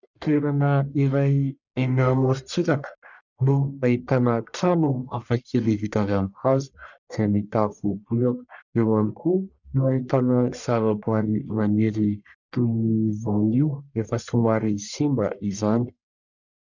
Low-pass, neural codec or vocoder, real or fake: 7.2 kHz; codec, 44.1 kHz, 1.7 kbps, Pupu-Codec; fake